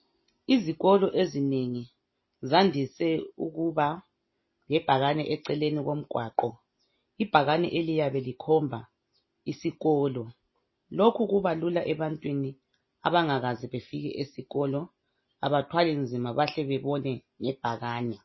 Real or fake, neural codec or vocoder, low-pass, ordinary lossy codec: real; none; 7.2 kHz; MP3, 24 kbps